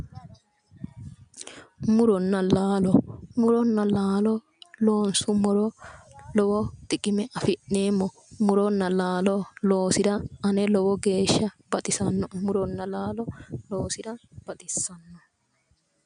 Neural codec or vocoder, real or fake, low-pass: none; real; 9.9 kHz